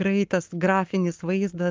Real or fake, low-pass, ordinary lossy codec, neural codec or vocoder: fake; 7.2 kHz; Opus, 24 kbps; codec, 44.1 kHz, 7.8 kbps, Pupu-Codec